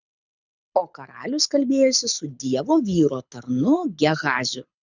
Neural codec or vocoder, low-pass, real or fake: codec, 24 kHz, 6 kbps, HILCodec; 7.2 kHz; fake